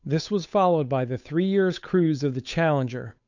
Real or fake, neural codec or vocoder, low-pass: fake; codec, 16 kHz, 8 kbps, FunCodec, trained on Chinese and English, 25 frames a second; 7.2 kHz